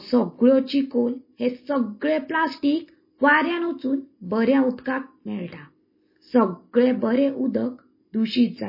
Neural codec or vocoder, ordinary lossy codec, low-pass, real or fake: none; MP3, 24 kbps; 5.4 kHz; real